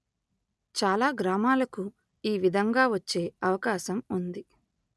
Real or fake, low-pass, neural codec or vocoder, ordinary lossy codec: real; none; none; none